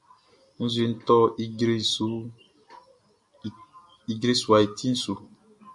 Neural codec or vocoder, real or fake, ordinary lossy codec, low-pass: none; real; MP3, 64 kbps; 10.8 kHz